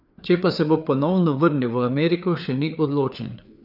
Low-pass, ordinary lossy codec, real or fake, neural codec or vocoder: 5.4 kHz; none; fake; codec, 16 kHz, 4 kbps, FreqCodec, larger model